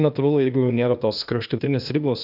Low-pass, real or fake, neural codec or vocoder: 5.4 kHz; fake; codec, 16 kHz, 0.8 kbps, ZipCodec